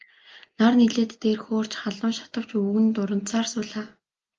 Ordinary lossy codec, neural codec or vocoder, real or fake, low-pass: Opus, 32 kbps; none; real; 7.2 kHz